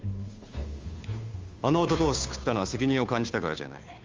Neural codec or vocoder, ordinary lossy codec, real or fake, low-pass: codec, 16 kHz, 2 kbps, FunCodec, trained on Chinese and English, 25 frames a second; Opus, 32 kbps; fake; 7.2 kHz